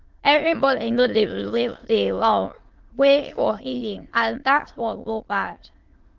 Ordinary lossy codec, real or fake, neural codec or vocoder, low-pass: Opus, 16 kbps; fake; autoencoder, 22.05 kHz, a latent of 192 numbers a frame, VITS, trained on many speakers; 7.2 kHz